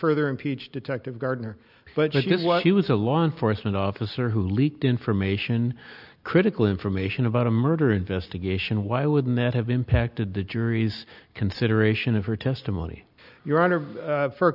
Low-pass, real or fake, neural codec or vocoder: 5.4 kHz; real; none